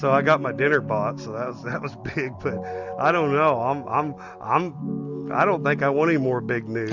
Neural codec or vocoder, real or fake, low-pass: none; real; 7.2 kHz